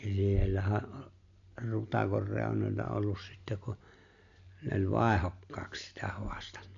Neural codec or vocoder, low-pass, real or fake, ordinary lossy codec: none; 7.2 kHz; real; AAC, 64 kbps